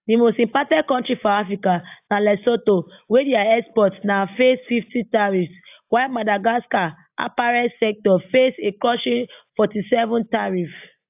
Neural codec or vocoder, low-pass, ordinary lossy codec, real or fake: none; 3.6 kHz; AAC, 32 kbps; real